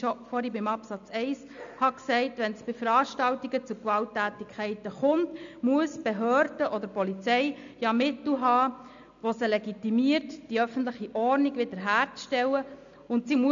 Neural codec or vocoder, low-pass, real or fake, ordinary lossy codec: none; 7.2 kHz; real; MP3, 64 kbps